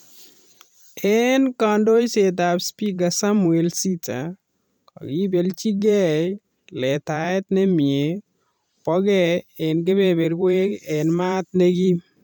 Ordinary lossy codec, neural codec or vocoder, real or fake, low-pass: none; vocoder, 44.1 kHz, 128 mel bands every 512 samples, BigVGAN v2; fake; none